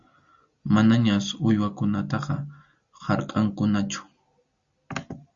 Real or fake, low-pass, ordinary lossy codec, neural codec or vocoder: real; 7.2 kHz; Opus, 64 kbps; none